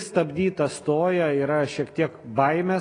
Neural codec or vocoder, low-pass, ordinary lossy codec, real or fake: none; 9.9 kHz; AAC, 32 kbps; real